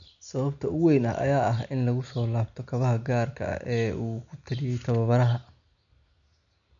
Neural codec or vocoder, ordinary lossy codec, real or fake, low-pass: none; none; real; 7.2 kHz